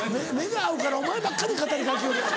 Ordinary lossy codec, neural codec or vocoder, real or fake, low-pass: none; none; real; none